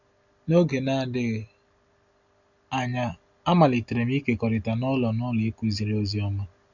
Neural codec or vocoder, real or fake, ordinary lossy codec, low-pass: none; real; none; 7.2 kHz